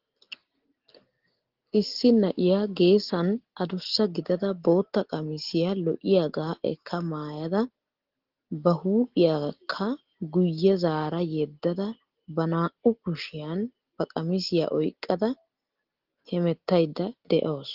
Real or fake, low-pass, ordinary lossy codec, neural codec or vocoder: real; 5.4 kHz; Opus, 16 kbps; none